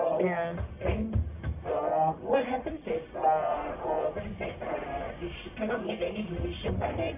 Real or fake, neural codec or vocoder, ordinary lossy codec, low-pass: fake; codec, 44.1 kHz, 1.7 kbps, Pupu-Codec; none; 3.6 kHz